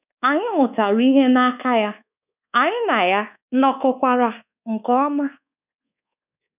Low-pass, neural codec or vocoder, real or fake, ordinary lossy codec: 3.6 kHz; codec, 24 kHz, 1.2 kbps, DualCodec; fake; none